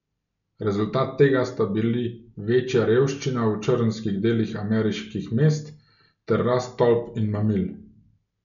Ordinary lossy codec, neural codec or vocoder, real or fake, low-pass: none; none; real; 7.2 kHz